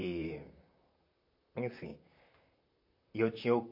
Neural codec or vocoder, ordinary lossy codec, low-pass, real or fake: vocoder, 44.1 kHz, 128 mel bands, Pupu-Vocoder; MP3, 32 kbps; 5.4 kHz; fake